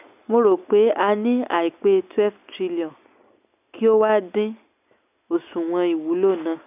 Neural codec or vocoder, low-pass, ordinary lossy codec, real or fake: none; 3.6 kHz; none; real